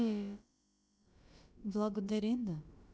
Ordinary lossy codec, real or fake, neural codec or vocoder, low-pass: none; fake; codec, 16 kHz, about 1 kbps, DyCAST, with the encoder's durations; none